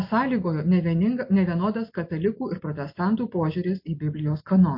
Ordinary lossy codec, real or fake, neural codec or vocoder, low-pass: MP3, 32 kbps; real; none; 5.4 kHz